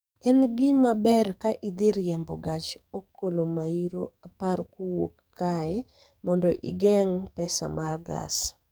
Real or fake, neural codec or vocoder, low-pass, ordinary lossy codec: fake; codec, 44.1 kHz, 2.6 kbps, SNAC; none; none